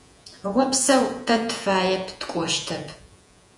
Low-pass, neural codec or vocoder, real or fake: 10.8 kHz; vocoder, 48 kHz, 128 mel bands, Vocos; fake